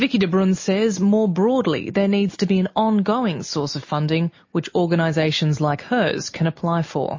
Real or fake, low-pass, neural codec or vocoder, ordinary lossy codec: real; 7.2 kHz; none; MP3, 32 kbps